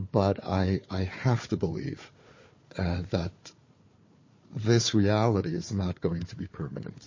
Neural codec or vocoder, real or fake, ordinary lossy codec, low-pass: codec, 16 kHz, 4 kbps, FunCodec, trained on Chinese and English, 50 frames a second; fake; MP3, 32 kbps; 7.2 kHz